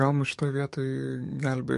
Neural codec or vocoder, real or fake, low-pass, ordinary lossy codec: codec, 44.1 kHz, 7.8 kbps, DAC; fake; 14.4 kHz; MP3, 48 kbps